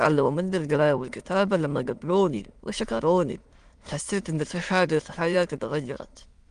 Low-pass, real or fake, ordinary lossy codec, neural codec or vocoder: 9.9 kHz; fake; Opus, 24 kbps; autoencoder, 22.05 kHz, a latent of 192 numbers a frame, VITS, trained on many speakers